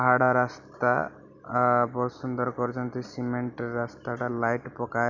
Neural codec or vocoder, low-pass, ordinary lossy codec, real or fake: none; 7.2 kHz; none; real